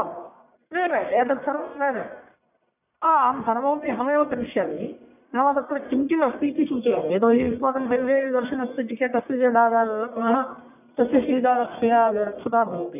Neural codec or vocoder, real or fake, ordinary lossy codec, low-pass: codec, 44.1 kHz, 1.7 kbps, Pupu-Codec; fake; none; 3.6 kHz